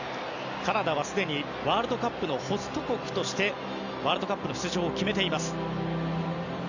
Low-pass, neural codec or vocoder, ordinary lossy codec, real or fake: 7.2 kHz; none; none; real